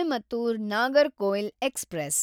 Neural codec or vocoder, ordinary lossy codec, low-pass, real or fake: none; none; none; real